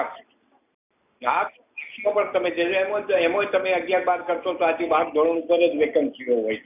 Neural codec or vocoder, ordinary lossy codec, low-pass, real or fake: none; none; 3.6 kHz; real